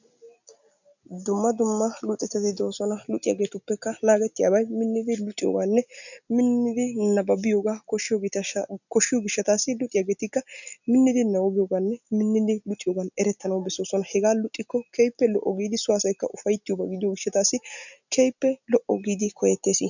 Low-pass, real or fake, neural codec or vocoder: 7.2 kHz; real; none